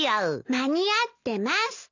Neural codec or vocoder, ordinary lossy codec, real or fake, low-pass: none; none; real; 7.2 kHz